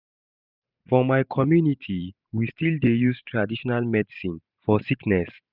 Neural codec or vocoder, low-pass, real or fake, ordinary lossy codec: vocoder, 44.1 kHz, 128 mel bands every 512 samples, BigVGAN v2; 5.4 kHz; fake; none